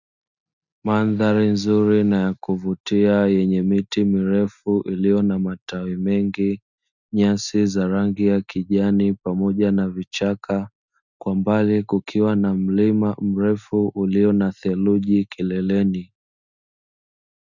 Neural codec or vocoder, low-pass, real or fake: none; 7.2 kHz; real